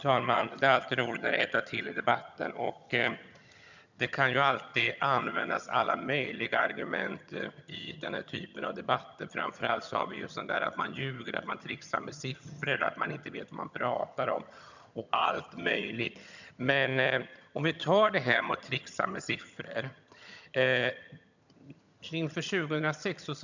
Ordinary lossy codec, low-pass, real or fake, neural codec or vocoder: none; 7.2 kHz; fake; vocoder, 22.05 kHz, 80 mel bands, HiFi-GAN